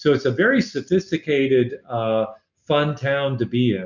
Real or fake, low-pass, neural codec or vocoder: real; 7.2 kHz; none